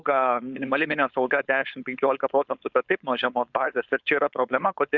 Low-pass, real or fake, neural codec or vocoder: 7.2 kHz; fake; codec, 16 kHz, 4.8 kbps, FACodec